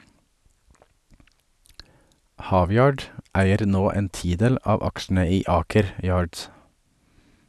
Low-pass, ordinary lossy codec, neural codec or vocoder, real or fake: none; none; none; real